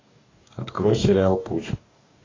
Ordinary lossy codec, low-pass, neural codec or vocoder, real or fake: AAC, 32 kbps; 7.2 kHz; codec, 44.1 kHz, 2.6 kbps, DAC; fake